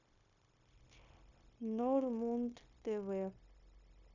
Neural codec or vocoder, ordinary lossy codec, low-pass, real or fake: codec, 16 kHz, 0.9 kbps, LongCat-Audio-Codec; none; 7.2 kHz; fake